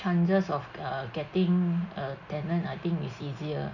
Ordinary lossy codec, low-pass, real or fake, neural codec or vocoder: none; 7.2 kHz; real; none